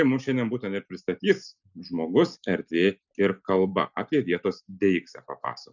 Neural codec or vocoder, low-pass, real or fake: none; 7.2 kHz; real